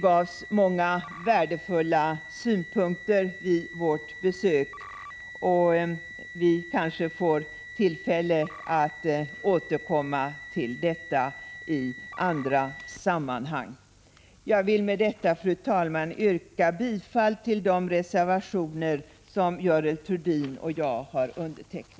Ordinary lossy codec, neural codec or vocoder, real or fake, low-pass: none; none; real; none